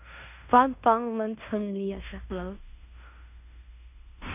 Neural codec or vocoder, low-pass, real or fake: codec, 16 kHz in and 24 kHz out, 0.9 kbps, LongCat-Audio-Codec, fine tuned four codebook decoder; 3.6 kHz; fake